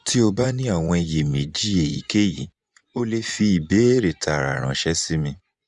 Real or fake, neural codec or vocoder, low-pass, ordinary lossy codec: fake; vocoder, 24 kHz, 100 mel bands, Vocos; 10.8 kHz; Opus, 64 kbps